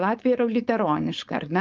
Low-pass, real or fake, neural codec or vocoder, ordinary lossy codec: 7.2 kHz; fake; codec, 16 kHz, 4.8 kbps, FACodec; Opus, 32 kbps